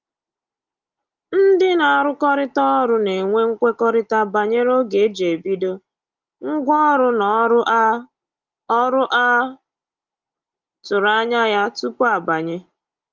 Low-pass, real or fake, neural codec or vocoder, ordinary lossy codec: 7.2 kHz; real; none; Opus, 32 kbps